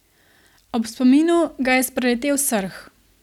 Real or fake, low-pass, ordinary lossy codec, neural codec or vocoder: real; 19.8 kHz; none; none